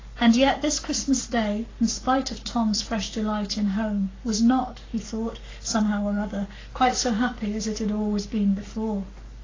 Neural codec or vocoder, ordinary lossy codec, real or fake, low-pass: codec, 44.1 kHz, 7.8 kbps, Pupu-Codec; AAC, 32 kbps; fake; 7.2 kHz